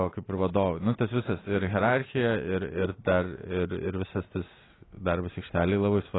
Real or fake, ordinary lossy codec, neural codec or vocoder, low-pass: real; AAC, 16 kbps; none; 7.2 kHz